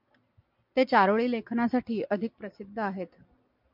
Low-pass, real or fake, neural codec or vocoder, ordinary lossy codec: 5.4 kHz; real; none; MP3, 48 kbps